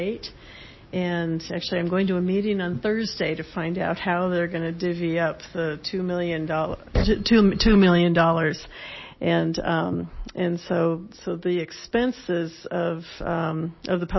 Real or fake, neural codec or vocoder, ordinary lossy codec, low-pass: real; none; MP3, 24 kbps; 7.2 kHz